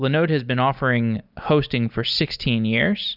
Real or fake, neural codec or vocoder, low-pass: real; none; 5.4 kHz